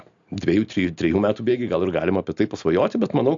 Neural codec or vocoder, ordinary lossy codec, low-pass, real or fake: none; AAC, 64 kbps; 7.2 kHz; real